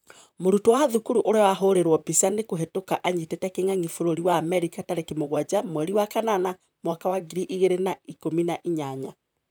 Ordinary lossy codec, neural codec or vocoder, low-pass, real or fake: none; vocoder, 44.1 kHz, 128 mel bands, Pupu-Vocoder; none; fake